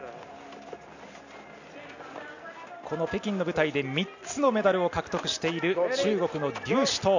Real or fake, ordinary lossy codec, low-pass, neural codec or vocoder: real; none; 7.2 kHz; none